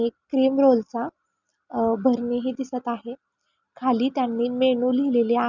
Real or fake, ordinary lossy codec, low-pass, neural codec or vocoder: real; none; 7.2 kHz; none